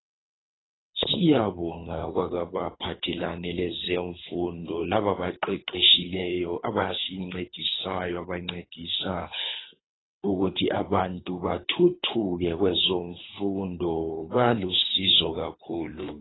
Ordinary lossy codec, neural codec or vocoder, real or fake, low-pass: AAC, 16 kbps; codec, 24 kHz, 3 kbps, HILCodec; fake; 7.2 kHz